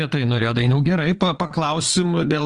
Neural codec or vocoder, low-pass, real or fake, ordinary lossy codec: vocoder, 22.05 kHz, 80 mel bands, Vocos; 9.9 kHz; fake; Opus, 24 kbps